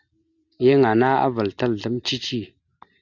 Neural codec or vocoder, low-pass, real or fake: none; 7.2 kHz; real